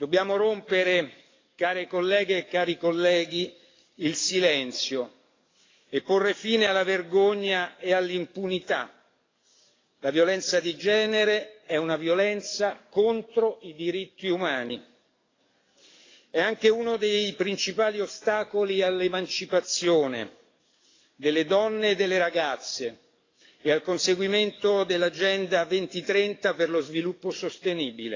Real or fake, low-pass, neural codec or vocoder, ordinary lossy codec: fake; 7.2 kHz; codec, 44.1 kHz, 7.8 kbps, DAC; AAC, 32 kbps